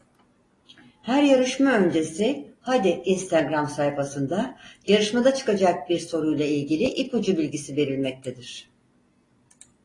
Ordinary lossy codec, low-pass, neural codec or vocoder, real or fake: AAC, 32 kbps; 10.8 kHz; none; real